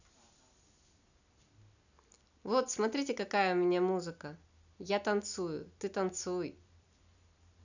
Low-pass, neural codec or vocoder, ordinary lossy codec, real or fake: 7.2 kHz; none; none; real